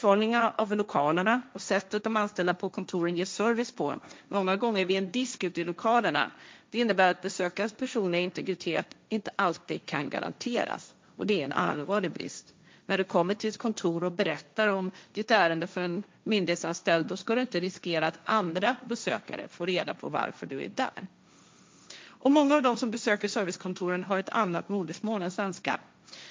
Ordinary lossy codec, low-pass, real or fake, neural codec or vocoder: none; none; fake; codec, 16 kHz, 1.1 kbps, Voila-Tokenizer